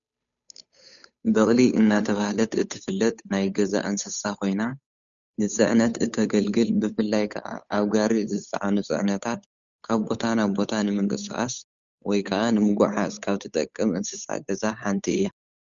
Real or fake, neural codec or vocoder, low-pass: fake; codec, 16 kHz, 8 kbps, FunCodec, trained on Chinese and English, 25 frames a second; 7.2 kHz